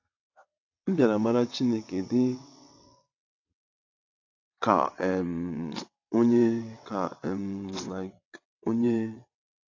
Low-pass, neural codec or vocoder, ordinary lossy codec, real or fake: 7.2 kHz; vocoder, 22.05 kHz, 80 mel bands, WaveNeXt; AAC, 48 kbps; fake